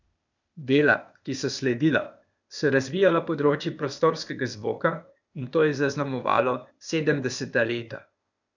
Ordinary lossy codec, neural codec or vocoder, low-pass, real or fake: none; codec, 16 kHz, 0.8 kbps, ZipCodec; 7.2 kHz; fake